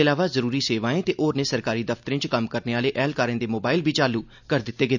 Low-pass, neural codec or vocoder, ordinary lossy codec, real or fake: 7.2 kHz; none; none; real